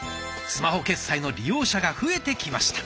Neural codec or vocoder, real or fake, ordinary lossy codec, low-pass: none; real; none; none